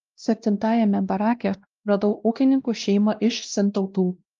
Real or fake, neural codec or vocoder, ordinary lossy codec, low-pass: fake; codec, 16 kHz, 1 kbps, X-Codec, WavLM features, trained on Multilingual LibriSpeech; Opus, 32 kbps; 7.2 kHz